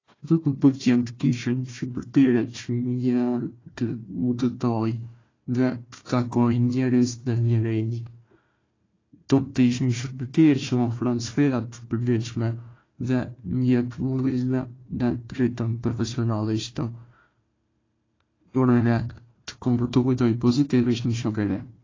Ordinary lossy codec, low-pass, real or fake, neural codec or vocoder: AAC, 32 kbps; 7.2 kHz; fake; codec, 16 kHz, 1 kbps, FunCodec, trained on Chinese and English, 50 frames a second